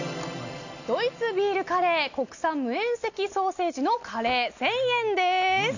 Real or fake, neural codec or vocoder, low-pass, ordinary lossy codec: real; none; 7.2 kHz; none